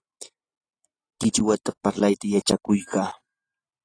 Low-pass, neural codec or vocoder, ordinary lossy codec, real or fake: 9.9 kHz; none; AAC, 32 kbps; real